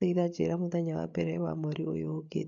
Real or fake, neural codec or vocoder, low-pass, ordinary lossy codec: fake; codec, 16 kHz, 8 kbps, FreqCodec, larger model; 7.2 kHz; none